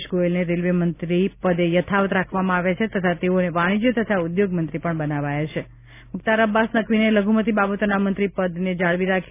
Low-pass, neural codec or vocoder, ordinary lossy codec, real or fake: 3.6 kHz; none; none; real